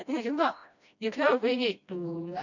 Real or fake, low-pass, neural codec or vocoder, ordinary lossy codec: fake; 7.2 kHz; codec, 16 kHz, 1 kbps, FreqCodec, smaller model; none